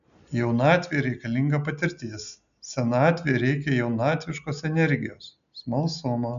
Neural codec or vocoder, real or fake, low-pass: none; real; 7.2 kHz